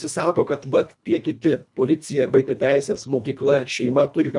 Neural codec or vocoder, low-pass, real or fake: codec, 24 kHz, 1.5 kbps, HILCodec; 10.8 kHz; fake